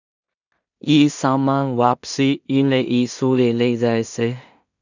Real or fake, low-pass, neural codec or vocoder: fake; 7.2 kHz; codec, 16 kHz in and 24 kHz out, 0.4 kbps, LongCat-Audio-Codec, two codebook decoder